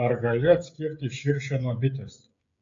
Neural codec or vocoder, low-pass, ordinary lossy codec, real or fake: codec, 16 kHz, 16 kbps, FreqCodec, smaller model; 7.2 kHz; AAC, 48 kbps; fake